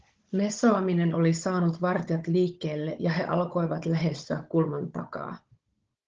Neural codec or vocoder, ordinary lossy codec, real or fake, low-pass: codec, 16 kHz, 16 kbps, FunCodec, trained on Chinese and English, 50 frames a second; Opus, 16 kbps; fake; 7.2 kHz